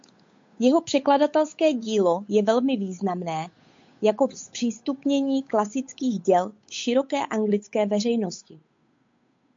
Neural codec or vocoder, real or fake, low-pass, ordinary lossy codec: codec, 16 kHz, 8 kbps, FunCodec, trained on Chinese and English, 25 frames a second; fake; 7.2 kHz; MP3, 48 kbps